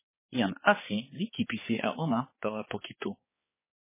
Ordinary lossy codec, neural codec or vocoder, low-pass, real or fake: MP3, 16 kbps; codec, 16 kHz, 4 kbps, X-Codec, HuBERT features, trained on balanced general audio; 3.6 kHz; fake